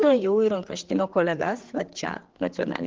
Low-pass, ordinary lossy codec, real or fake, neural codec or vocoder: 7.2 kHz; Opus, 16 kbps; fake; codec, 16 kHz, 4 kbps, X-Codec, HuBERT features, trained on general audio